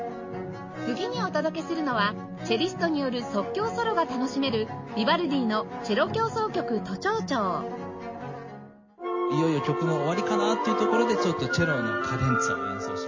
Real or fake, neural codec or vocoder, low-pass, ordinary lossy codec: real; none; 7.2 kHz; MP3, 32 kbps